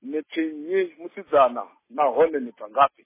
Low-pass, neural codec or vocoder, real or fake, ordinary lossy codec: 3.6 kHz; none; real; MP3, 16 kbps